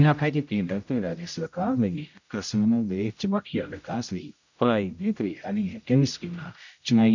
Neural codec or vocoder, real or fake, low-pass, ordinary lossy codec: codec, 16 kHz, 0.5 kbps, X-Codec, HuBERT features, trained on general audio; fake; 7.2 kHz; none